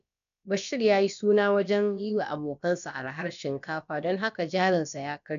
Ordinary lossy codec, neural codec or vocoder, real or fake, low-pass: none; codec, 16 kHz, about 1 kbps, DyCAST, with the encoder's durations; fake; 7.2 kHz